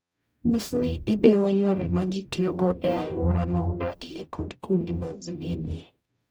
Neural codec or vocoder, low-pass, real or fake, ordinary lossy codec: codec, 44.1 kHz, 0.9 kbps, DAC; none; fake; none